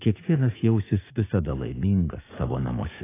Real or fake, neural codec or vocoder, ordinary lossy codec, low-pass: fake; codec, 16 kHz, 6 kbps, DAC; AAC, 16 kbps; 3.6 kHz